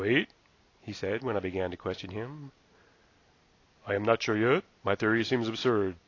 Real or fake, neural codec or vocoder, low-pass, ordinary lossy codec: real; none; 7.2 kHz; AAC, 32 kbps